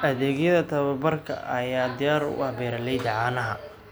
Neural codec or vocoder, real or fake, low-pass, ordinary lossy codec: none; real; none; none